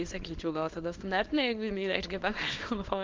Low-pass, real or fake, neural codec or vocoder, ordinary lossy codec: 7.2 kHz; fake; codec, 24 kHz, 0.9 kbps, WavTokenizer, small release; Opus, 16 kbps